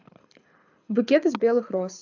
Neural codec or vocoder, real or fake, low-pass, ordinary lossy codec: codec, 24 kHz, 6 kbps, HILCodec; fake; 7.2 kHz; AAC, 48 kbps